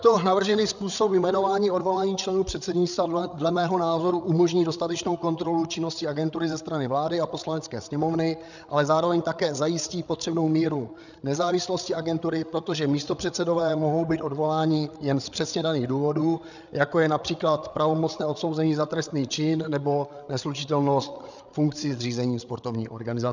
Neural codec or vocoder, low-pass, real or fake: codec, 16 kHz, 8 kbps, FreqCodec, larger model; 7.2 kHz; fake